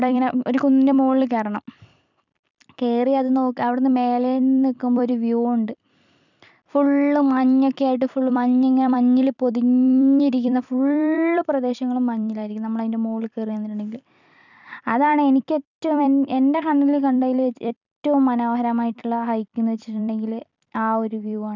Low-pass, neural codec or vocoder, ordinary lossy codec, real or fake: 7.2 kHz; vocoder, 44.1 kHz, 128 mel bands every 256 samples, BigVGAN v2; none; fake